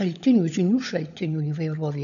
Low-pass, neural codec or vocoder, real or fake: 7.2 kHz; codec, 16 kHz, 16 kbps, FunCodec, trained on LibriTTS, 50 frames a second; fake